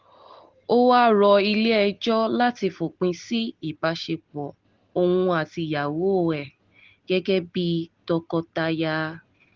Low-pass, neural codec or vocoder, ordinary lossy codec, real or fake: 7.2 kHz; none; Opus, 16 kbps; real